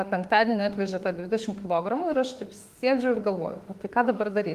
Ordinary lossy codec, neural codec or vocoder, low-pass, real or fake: Opus, 24 kbps; autoencoder, 48 kHz, 32 numbers a frame, DAC-VAE, trained on Japanese speech; 14.4 kHz; fake